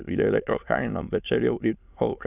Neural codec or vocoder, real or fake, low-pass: autoencoder, 22.05 kHz, a latent of 192 numbers a frame, VITS, trained on many speakers; fake; 3.6 kHz